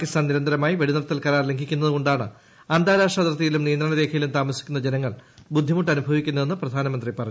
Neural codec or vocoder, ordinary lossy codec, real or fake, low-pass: none; none; real; none